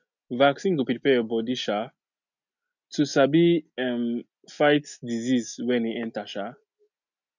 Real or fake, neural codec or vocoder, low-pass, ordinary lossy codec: real; none; 7.2 kHz; none